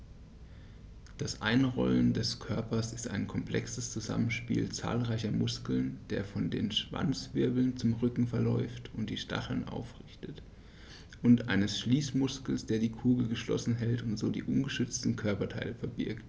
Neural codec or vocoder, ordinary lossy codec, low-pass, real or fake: none; none; none; real